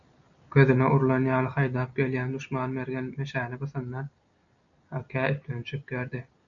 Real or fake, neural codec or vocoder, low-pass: real; none; 7.2 kHz